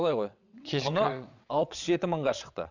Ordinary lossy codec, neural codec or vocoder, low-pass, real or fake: none; vocoder, 22.05 kHz, 80 mel bands, WaveNeXt; 7.2 kHz; fake